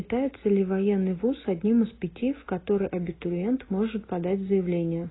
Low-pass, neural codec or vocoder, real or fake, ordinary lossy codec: 7.2 kHz; none; real; AAC, 16 kbps